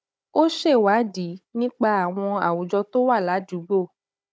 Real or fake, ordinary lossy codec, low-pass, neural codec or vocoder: fake; none; none; codec, 16 kHz, 16 kbps, FunCodec, trained on Chinese and English, 50 frames a second